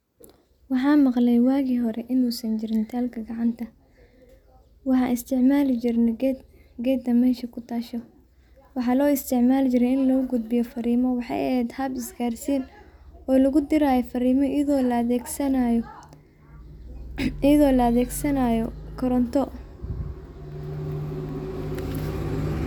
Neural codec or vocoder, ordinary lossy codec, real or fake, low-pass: none; none; real; 19.8 kHz